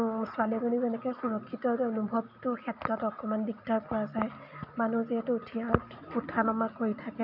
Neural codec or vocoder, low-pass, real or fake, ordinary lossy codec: none; 5.4 kHz; real; none